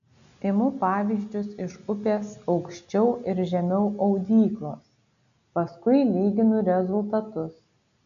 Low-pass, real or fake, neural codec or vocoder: 7.2 kHz; real; none